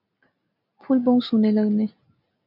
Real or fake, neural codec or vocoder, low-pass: real; none; 5.4 kHz